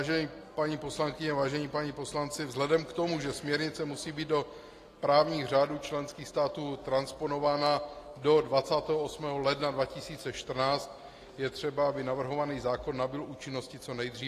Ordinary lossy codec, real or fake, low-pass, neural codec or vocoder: AAC, 48 kbps; real; 14.4 kHz; none